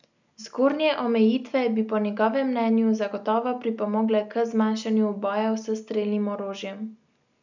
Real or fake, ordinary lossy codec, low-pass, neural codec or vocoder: real; none; 7.2 kHz; none